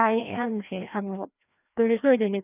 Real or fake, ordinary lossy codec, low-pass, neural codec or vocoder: fake; none; 3.6 kHz; codec, 16 kHz, 1 kbps, FreqCodec, larger model